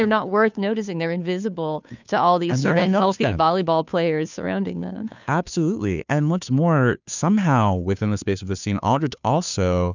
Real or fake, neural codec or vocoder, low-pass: fake; codec, 16 kHz, 2 kbps, FunCodec, trained on Chinese and English, 25 frames a second; 7.2 kHz